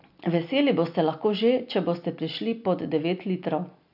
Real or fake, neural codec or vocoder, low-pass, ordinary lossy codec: real; none; 5.4 kHz; none